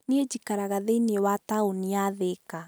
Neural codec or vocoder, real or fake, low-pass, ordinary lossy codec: none; real; none; none